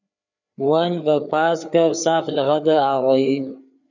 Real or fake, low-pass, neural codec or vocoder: fake; 7.2 kHz; codec, 16 kHz, 4 kbps, FreqCodec, larger model